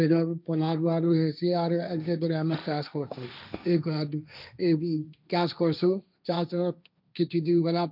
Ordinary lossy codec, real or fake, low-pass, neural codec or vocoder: none; fake; 5.4 kHz; codec, 16 kHz, 1.1 kbps, Voila-Tokenizer